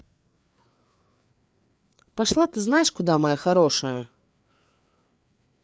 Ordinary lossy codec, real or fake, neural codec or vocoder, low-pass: none; fake; codec, 16 kHz, 4 kbps, FreqCodec, larger model; none